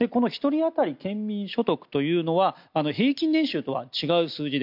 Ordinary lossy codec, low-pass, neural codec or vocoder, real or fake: MP3, 48 kbps; 5.4 kHz; none; real